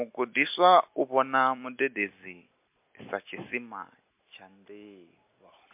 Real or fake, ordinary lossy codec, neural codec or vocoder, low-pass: real; MP3, 32 kbps; none; 3.6 kHz